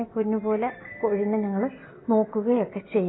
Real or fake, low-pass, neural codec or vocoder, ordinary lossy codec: real; 7.2 kHz; none; AAC, 16 kbps